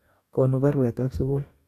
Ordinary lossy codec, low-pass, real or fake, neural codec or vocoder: AAC, 64 kbps; 14.4 kHz; fake; codec, 44.1 kHz, 2.6 kbps, DAC